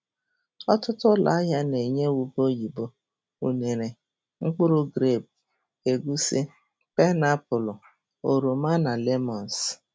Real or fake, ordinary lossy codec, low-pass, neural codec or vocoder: real; none; none; none